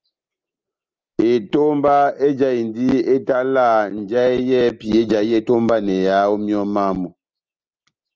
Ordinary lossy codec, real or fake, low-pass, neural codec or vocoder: Opus, 24 kbps; real; 7.2 kHz; none